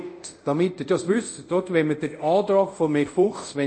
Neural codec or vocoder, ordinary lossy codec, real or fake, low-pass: codec, 24 kHz, 0.5 kbps, DualCodec; none; fake; 9.9 kHz